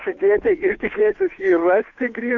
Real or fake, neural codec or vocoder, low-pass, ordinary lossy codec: fake; codec, 16 kHz, 2 kbps, FunCodec, trained on Chinese and English, 25 frames a second; 7.2 kHz; AAC, 48 kbps